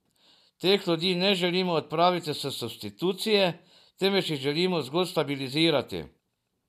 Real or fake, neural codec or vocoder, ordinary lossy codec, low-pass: real; none; none; 14.4 kHz